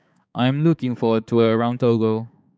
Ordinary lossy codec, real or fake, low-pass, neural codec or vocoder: none; fake; none; codec, 16 kHz, 4 kbps, X-Codec, HuBERT features, trained on balanced general audio